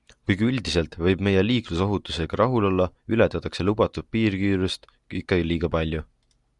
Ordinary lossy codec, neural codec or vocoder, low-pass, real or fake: Opus, 64 kbps; none; 10.8 kHz; real